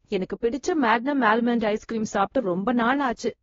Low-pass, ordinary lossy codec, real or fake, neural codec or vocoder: 7.2 kHz; AAC, 24 kbps; fake; codec, 16 kHz, 1 kbps, X-Codec, WavLM features, trained on Multilingual LibriSpeech